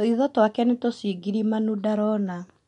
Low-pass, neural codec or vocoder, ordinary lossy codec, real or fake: 10.8 kHz; none; MP3, 64 kbps; real